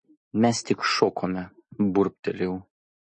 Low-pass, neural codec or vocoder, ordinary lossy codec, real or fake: 10.8 kHz; none; MP3, 32 kbps; real